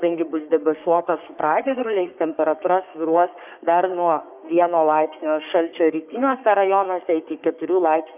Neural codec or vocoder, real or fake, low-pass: codec, 44.1 kHz, 3.4 kbps, Pupu-Codec; fake; 3.6 kHz